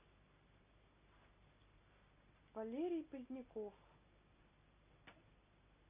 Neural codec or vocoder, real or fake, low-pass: none; real; 3.6 kHz